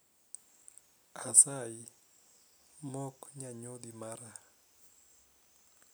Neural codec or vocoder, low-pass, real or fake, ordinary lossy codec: none; none; real; none